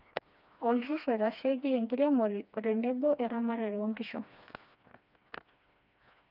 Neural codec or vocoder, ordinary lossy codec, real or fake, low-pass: codec, 16 kHz, 2 kbps, FreqCodec, smaller model; none; fake; 5.4 kHz